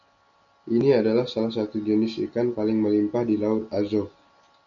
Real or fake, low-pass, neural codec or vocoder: real; 7.2 kHz; none